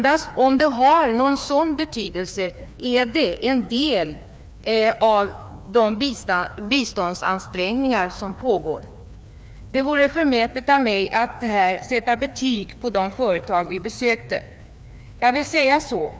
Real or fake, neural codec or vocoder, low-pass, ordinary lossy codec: fake; codec, 16 kHz, 2 kbps, FreqCodec, larger model; none; none